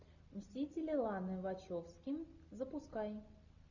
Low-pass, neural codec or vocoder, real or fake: 7.2 kHz; none; real